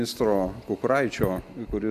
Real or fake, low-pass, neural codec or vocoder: real; 14.4 kHz; none